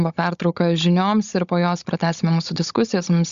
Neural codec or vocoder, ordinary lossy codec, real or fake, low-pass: codec, 16 kHz, 16 kbps, FunCodec, trained on Chinese and English, 50 frames a second; Opus, 64 kbps; fake; 7.2 kHz